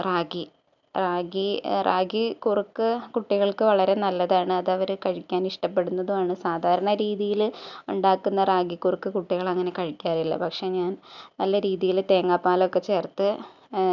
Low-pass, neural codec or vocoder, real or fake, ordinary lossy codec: 7.2 kHz; none; real; none